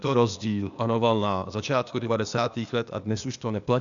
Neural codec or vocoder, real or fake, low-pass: codec, 16 kHz, 0.8 kbps, ZipCodec; fake; 7.2 kHz